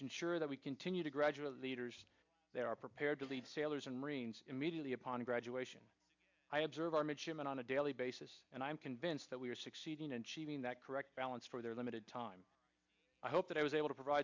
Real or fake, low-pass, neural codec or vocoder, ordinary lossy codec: real; 7.2 kHz; none; MP3, 64 kbps